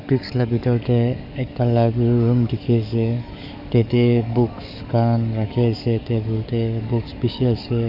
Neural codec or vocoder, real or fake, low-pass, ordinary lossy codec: codec, 44.1 kHz, 7.8 kbps, DAC; fake; 5.4 kHz; none